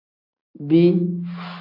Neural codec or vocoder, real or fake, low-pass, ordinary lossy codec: none; real; 5.4 kHz; AAC, 48 kbps